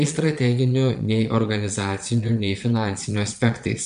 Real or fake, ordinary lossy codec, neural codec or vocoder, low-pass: fake; MP3, 64 kbps; vocoder, 22.05 kHz, 80 mel bands, Vocos; 9.9 kHz